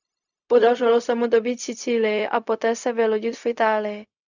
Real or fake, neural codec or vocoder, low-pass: fake; codec, 16 kHz, 0.4 kbps, LongCat-Audio-Codec; 7.2 kHz